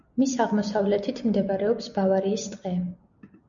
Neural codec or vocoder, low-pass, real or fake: none; 7.2 kHz; real